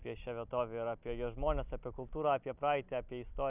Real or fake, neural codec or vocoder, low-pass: real; none; 3.6 kHz